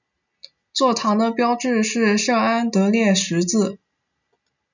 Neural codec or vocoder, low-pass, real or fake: none; 7.2 kHz; real